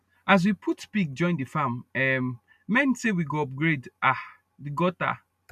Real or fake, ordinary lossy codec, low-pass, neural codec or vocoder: real; none; 14.4 kHz; none